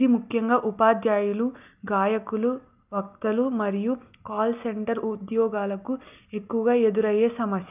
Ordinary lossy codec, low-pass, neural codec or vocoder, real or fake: none; 3.6 kHz; none; real